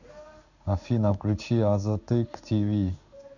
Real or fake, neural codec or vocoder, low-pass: fake; codec, 16 kHz in and 24 kHz out, 1 kbps, XY-Tokenizer; 7.2 kHz